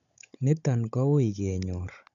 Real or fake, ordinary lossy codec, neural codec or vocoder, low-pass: fake; none; codec, 16 kHz, 16 kbps, FunCodec, trained on Chinese and English, 50 frames a second; 7.2 kHz